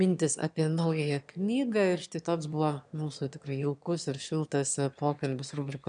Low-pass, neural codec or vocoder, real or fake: 9.9 kHz; autoencoder, 22.05 kHz, a latent of 192 numbers a frame, VITS, trained on one speaker; fake